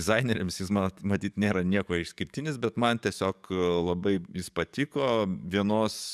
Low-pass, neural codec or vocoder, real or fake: 14.4 kHz; codec, 44.1 kHz, 7.8 kbps, DAC; fake